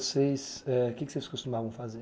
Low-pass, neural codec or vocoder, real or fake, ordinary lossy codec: none; none; real; none